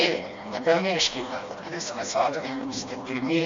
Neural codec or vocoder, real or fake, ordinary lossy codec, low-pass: codec, 16 kHz, 1 kbps, FreqCodec, smaller model; fake; MP3, 48 kbps; 7.2 kHz